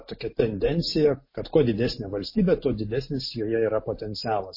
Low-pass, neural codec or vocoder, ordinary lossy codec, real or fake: 5.4 kHz; none; MP3, 24 kbps; real